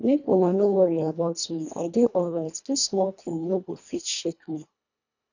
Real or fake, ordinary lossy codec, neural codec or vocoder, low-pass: fake; none; codec, 24 kHz, 1.5 kbps, HILCodec; 7.2 kHz